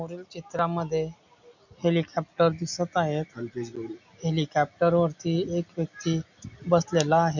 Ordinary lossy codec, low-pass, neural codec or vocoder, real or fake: none; 7.2 kHz; none; real